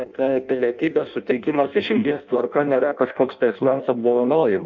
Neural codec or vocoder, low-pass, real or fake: codec, 16 kHz in and 24 kHz out, 0.6 kbps, FireRedTTS-2 codec; 7.2 kHz; fake